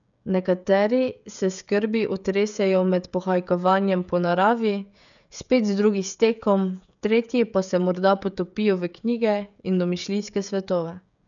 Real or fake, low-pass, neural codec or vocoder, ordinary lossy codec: fake; 7.2 kHz; codec, 16 kHz, 16 kbps, FreqCodec, smaller model; none